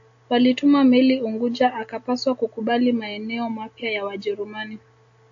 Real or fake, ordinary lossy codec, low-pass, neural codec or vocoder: real; AAC, 48 kbps; 7.2 kHz; none